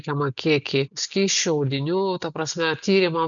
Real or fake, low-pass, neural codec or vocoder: real; 7.2 kHz; none